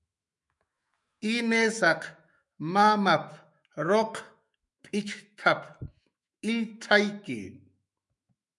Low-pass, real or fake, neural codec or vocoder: 10.8 kHz; fake; autoencoder, 48 kHz, 128 numbers a frame, DAC-VAE, trained on Japanese speech